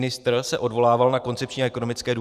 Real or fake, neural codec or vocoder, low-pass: fake; vocoder, 44.1 kHz, 128 mel bands every 512 samples, BigVGAN v2; 14.4 kHz